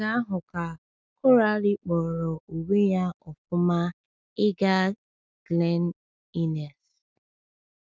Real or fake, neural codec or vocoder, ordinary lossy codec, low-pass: real; none; none; none